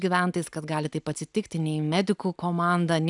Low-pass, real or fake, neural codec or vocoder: 10.8 kHz; real; none